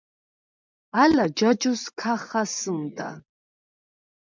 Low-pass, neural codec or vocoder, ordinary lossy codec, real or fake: 7.2 kHz; vocoder, 24 kHz, 100 mel bands, Vocos; MP3, 64 kbps; fake